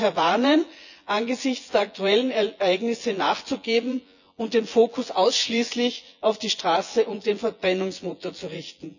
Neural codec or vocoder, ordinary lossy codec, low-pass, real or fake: vocoder, 24 kHz, 100 mel bands, Vocos; none; 7.2 kHz; fake